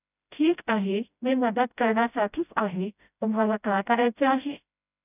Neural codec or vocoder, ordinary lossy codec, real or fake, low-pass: codec, 16 kHz, 0.5 kbps, FreqCodec, smaller model; none; fake; 3.6 kHz